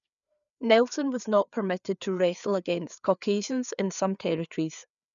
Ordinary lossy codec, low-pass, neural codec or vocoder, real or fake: none; 7.2 kHz; codec, 16 kHz, 4 kbps, FreqCodec, larger model; fake